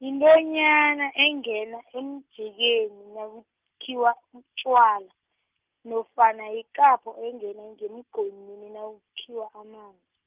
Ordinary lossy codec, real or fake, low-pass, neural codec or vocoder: Opus, 32 kbps; real; 3.6 kHz; none